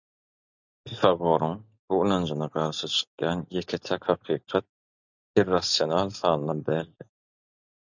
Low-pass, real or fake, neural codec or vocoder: 7.2 kHz; real; none